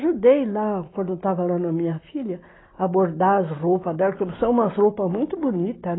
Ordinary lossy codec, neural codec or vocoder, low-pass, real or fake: AAC, 16 kbps; none; 7.2 kHz; real